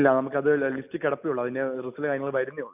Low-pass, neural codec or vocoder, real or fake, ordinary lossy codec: 3.6 kHz; none; real; none